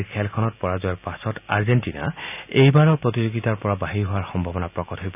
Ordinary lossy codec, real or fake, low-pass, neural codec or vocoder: none; real; 3.6 kHz; none